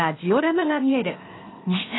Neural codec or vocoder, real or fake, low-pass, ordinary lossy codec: codec, 16 kHz, 0.8 kbps, ZipCodec; fake; 7.2 kHz; AAC, 16 kbps